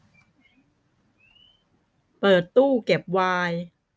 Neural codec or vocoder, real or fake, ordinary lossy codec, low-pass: none; real; none; none